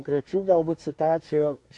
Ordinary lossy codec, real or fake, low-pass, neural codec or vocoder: MP3, 64 kbps; fake; 10.8 kHz; codec, 24 kHz, 1 kbps, SNAC